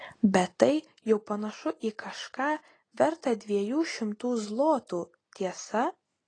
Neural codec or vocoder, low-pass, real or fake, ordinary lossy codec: none; 9.9 kHz; real; AAC, 32 kbps